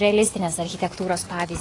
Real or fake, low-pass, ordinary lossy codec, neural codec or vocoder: real; 10.8 kHz; AAC, 32 kbps; none